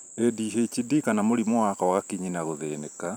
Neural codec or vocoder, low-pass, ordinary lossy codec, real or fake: vocoder, 44.1 kHz, 128 mel bands every 512 samples, BigVGAN v2; none; none; fake